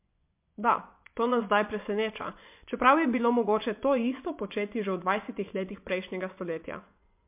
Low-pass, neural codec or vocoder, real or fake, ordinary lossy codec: 3.6 kHz; vocoder, 24 kHz, 100 mel bands, Vocos; fake; MP3, 32 kbps